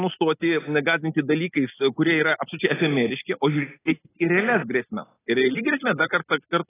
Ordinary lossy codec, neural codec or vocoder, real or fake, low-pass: AAC, 16 kbps; none; real; 3.6 kHz